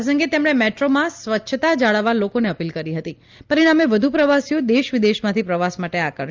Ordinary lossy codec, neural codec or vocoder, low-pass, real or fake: Opus, 24 kbps; none; 7.2 kHz; real